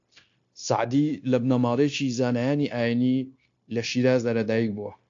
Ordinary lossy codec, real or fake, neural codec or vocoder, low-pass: AAC, 48 kbps; fake; codec, 16 kHz, 0.9 kbps, LongCat-Audio-Codec; 7.2 kHz